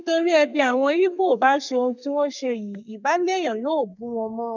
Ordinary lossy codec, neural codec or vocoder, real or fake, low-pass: none; codec, 32 kHz, 1.9 kbps, SNAC; fake; 7.2 kHz